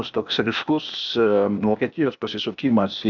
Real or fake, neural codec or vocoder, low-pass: fake; codec, 16 kHz, 0.8 kbps, ZipCodec; 7.2 kHz